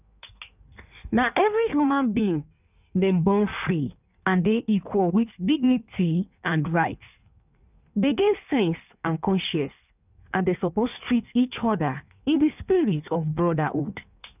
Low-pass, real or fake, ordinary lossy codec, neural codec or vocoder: 3.6 kHz; fake; none; codec, 16 kHz in and 24 kHz out, 1.1 kbps, FireRedTTS-2 codec